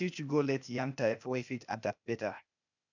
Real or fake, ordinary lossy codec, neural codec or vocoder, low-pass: fake; none; codec, 16 kHz, 0.8 kbps, ZipCodec; 7.2 kHz